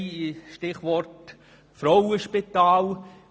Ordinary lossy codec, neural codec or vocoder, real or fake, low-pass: none; none; real; none